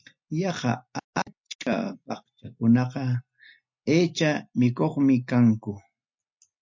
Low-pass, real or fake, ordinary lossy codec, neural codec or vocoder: 7.2 kHz; real; MP3, 48 kbps; none